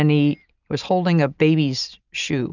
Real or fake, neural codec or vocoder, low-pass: real; none; 7.2 kHz